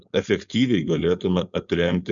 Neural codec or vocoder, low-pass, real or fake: codec, 16 kHz, 4.8 kbps, FACodec; 7.2 kHz; fake